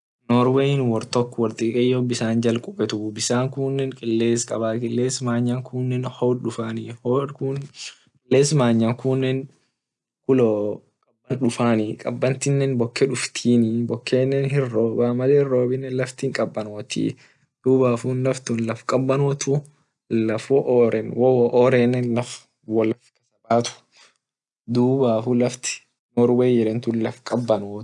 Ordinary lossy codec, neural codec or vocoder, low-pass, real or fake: none; none; 10.8 kHz; real